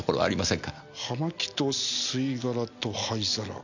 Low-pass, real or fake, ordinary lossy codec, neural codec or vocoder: 7.2 kHz; real; none; none